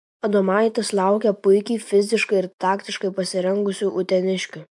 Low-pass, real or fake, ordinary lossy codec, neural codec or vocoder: 10.8 kHz; fake; MP3, 64 kbps; vocoder, 24 kHz, 100 mel bands, Vocos